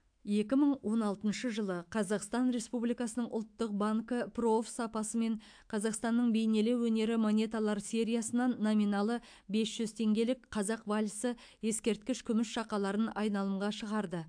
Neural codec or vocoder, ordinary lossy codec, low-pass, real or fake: autoencoder, 48 kHz, 128 numbers a frame, DAC-VAE, trained on Japanese speech; AAC, 64 kbps; 9.9 kHz; fake